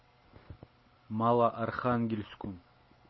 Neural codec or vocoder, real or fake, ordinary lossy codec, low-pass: none; real; MP3, 24 kbps; 7.2 kHz